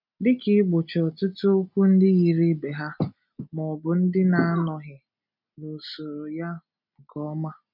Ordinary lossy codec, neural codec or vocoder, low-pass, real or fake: none; none; 5.4 kHz; real